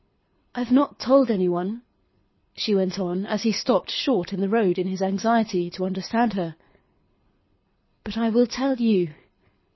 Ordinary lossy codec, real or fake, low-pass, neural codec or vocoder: MP3, 24 kbps; fake; 7.2 kHz; codec, 24 kHz, 6 kbps, HILCodec